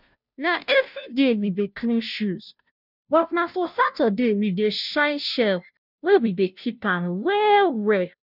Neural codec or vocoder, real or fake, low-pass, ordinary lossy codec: codec, 16 kHz, 0.5 kbps, FunCodec, trained on Chinese and English, 25 frames a second; fake; 5.4 kHz; none